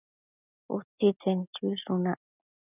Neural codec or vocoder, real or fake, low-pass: none; real; 3.6 kHz